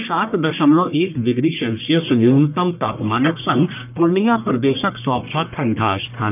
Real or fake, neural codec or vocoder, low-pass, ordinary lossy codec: fake; codec, 44.1 kHz, 1.7 kbps, Pupu-Codec; 3.6 kHz; none